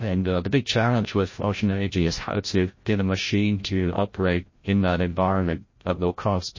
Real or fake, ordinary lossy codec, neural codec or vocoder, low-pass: fake; MP3, 32 kbps; codec, 16 kHz, 0.5 kbps, FreqCodec, larger model; 7.2 kHz